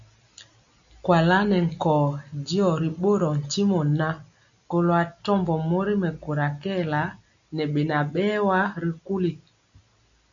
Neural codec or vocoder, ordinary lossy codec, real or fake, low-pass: none; MP3, 96 kbps; real; 7.2 kHz